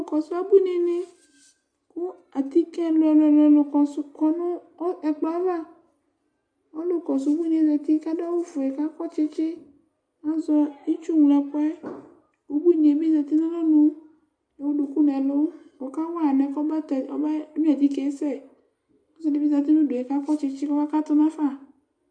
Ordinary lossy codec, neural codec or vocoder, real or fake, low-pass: Opus, 64 kbps; none; real; 9.9 kHz